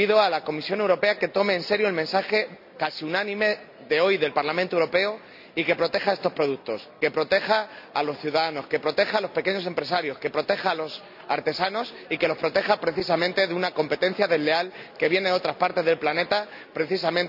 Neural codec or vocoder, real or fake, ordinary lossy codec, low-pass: none; real; none; 5.4 kHz